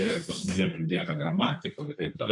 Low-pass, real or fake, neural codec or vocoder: 10.8 kHz; fake; codec, 24 kHz, 1 kbps, SNAC